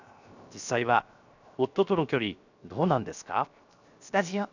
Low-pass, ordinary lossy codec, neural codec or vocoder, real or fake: 7.2 kHz; none; codec, 16 kHz, 0.7 kbps, FocalCodec; fake